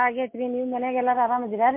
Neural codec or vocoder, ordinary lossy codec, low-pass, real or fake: none; MP3, 24 kbps; 3.6 kHz; real